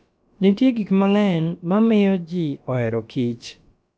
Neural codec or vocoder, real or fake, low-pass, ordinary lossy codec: codec, 16 kHz, about 1 kbps, DyCAST, with the encoder's durations; fake; none; none